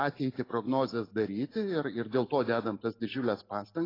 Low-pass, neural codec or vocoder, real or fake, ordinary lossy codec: 5.4 kHz; none; real; AAC, 24 kbps